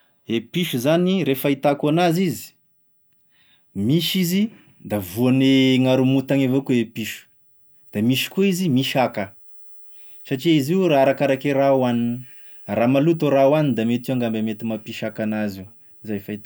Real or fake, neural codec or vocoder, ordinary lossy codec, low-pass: real; none; none; none